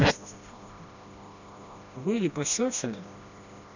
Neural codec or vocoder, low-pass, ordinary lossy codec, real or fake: codec, 16 kHz, 1 kbps, FreqCodec, smaller model; 7.2 kHz; none; fake